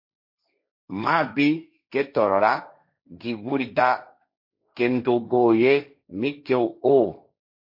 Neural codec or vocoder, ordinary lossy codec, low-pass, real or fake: codec, 16 kHz, 1.1 kbps, Voila-Tokenizer; MP3, 32 kbps; 5.4 kHz; fake